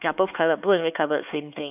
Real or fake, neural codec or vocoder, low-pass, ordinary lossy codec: fake; codec, 16 kHz, 4 kbps, X-Codec, HuBERT features, trained on LibriSpeech; 3.6 kHz; none